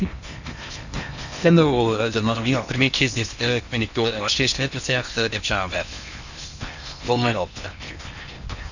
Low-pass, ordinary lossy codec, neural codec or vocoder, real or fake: 7.2 kHz; none; codec, 16 kHz in and 24 kHz out, 0.8 kbps, FocalCodec, streaming, 65536 codes; fake